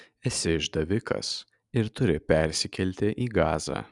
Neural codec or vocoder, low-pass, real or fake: none; 10.8 kHz; real